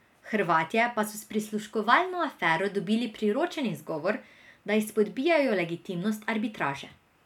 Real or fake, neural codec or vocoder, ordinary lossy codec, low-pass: real; none; none; 19.8 kHz